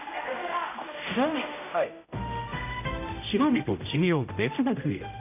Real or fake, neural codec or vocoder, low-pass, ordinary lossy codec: fake; codec, 16 kHz, 0.5 kbps, X-Codec, HuBERT features, trained on general audio; 3.6 kHz; none